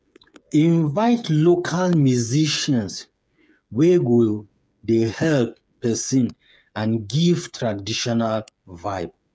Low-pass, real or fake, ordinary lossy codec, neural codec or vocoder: none; fake; none; codec, 16 kHz, 8 kbps, FreqCodec, smaller model